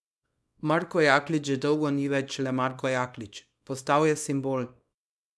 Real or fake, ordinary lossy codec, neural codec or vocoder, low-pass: fake; none; codec, 24 kHz, 0.9 kbps, WavTokenizer, small release; none